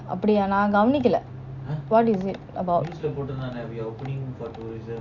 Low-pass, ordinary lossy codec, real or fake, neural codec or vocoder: 7.2 kHz; none; real; none